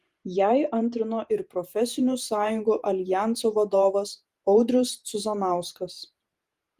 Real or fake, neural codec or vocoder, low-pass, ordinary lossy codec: fake; vocoder, 48 kHz, 128 mel bands, Vocos; 14.4 kHz; Opus, 24 kbps